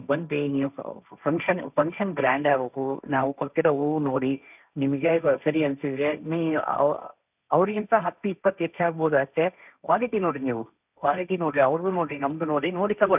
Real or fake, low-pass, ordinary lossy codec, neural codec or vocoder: fake; 3.6 kHz; none; codec, 16 kHz, 1.1 kbps, Voila-Tokenizer